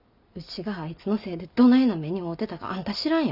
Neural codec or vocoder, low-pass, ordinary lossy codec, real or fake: none; 5.4 kHz; none; real